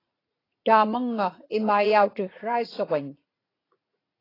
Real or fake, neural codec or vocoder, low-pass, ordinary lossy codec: fake; vocoder, 44.1 kHz, 80 mel bands, Vocos; 5.4 kHz; AAC, 24 kbps